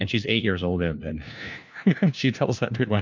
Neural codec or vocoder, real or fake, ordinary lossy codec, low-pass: codec, 16 kHz, 1 kbps, FunCodec, trained on LibriTTS, 50 frames a second; fake; MP3, 64 kbps; 7.2 kHz